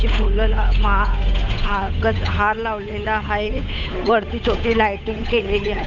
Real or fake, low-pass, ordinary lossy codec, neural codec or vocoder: fake; 7.2 kHz; none; codec, 16 kHz, 8 kbps, FunCodec, trained on Chinese and English, 25 frames a second